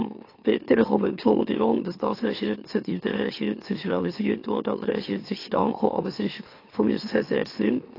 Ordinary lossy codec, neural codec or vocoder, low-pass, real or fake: AAC, 32 kbps; autoencoder, 44.1 kHz, a latent of 192 numbers a frame, MeloTTS; 5.4 kHz; fake